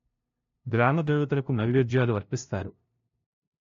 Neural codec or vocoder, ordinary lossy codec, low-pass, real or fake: codec, 16 kHz, 0.5 kbps, FunCodec, trained on LibriTTS, 25 frames a second; AAC, 48 kbps; 7.2 kHz; fake